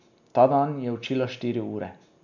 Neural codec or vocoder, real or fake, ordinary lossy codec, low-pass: none; real; none; 7.2 kHz